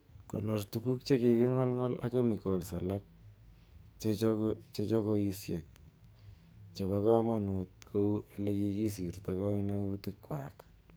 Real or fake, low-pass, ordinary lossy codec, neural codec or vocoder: fake; none; none; codec, 44.1 kHz, 2.6 kbps, SNAC